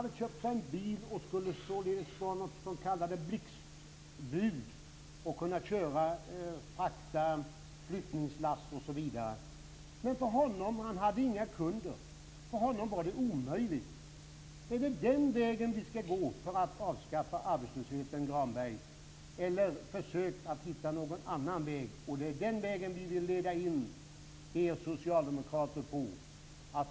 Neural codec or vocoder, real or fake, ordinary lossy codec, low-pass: none; real; none; none